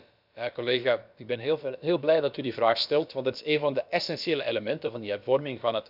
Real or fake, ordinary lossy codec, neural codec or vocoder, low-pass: fake; none; codec, 16 kHz, about 1 kbps, DyCAST, with the encoder's durations; 5.4 kHz